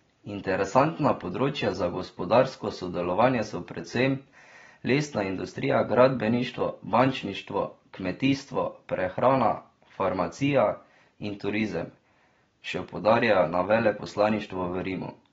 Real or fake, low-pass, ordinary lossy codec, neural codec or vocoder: real; 7.2 kHz; AAC, 24 kbps; none